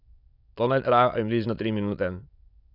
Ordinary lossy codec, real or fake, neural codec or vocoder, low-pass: none; fake; autoencoder, 22.05 kHz, a latent of 192 numbers a frame, VITS, trained on many speakers; 5.4 kHz